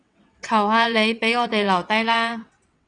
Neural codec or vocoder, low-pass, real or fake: vocoder, 22.05 kHz, 80 mel bands, WaveNeXt; 9.9 kHz; fake